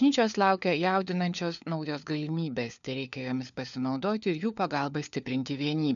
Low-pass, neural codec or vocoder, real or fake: 7.2 kHz; codec, 16 kHz, 4 kbps, FunCodec, trained on LibriTTS, 50 frames a second; fake